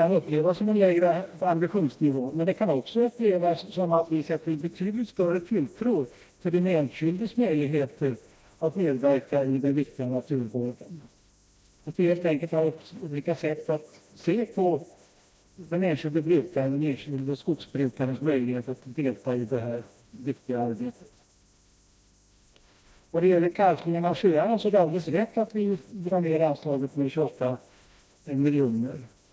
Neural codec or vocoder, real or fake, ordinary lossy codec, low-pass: codec, 16 kHz, 1 kbps, FreqCodec, smaller model; fake; none; none